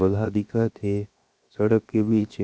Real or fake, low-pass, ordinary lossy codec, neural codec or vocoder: fake; none; none; codec, 16 kHz, 0.7 kbps, FocalCodec